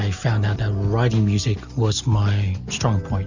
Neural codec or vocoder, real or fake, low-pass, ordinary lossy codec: none; real; 7.2 kHz; Opus, 64 kbps